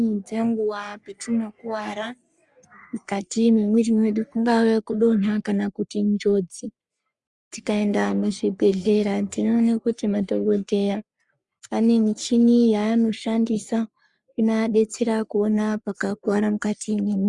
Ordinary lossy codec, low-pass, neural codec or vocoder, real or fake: Opus, 64 kbps; 10.8 kHz; codec, 44.1 kHz, 3.4 kbps, Pupu-Codec; fake